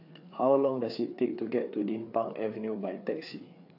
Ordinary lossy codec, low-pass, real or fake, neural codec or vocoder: AAC, 48 kbps; 5.4 kHz; fake; codec, 16 kHz, 4 kbps, FreqCodec, larger model